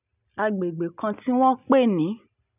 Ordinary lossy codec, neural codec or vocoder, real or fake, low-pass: none; none; real; 3.6 kHz